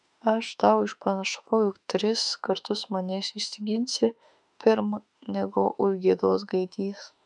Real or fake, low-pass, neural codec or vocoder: fake; 10.8 kHz; autoencoder, 48 kHz, 32 numbers a frame, DAC-VAE, trained on Japanese speech